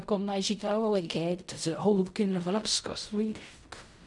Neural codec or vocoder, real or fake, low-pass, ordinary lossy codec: codec, 16 kHz in and 24 kHz out, 0.4 kbps, LongCat-Audio-Codec, fine tuned four codebook decoder; fake; 10.8 kHz; none